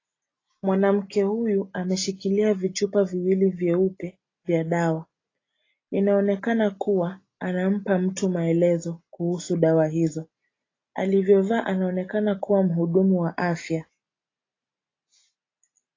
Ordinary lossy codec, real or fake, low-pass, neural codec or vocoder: AAC, 32 kbps; real; 7.2 kHz; none